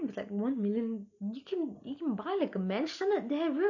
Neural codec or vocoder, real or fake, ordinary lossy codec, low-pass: none; real; none; 7.2 kHz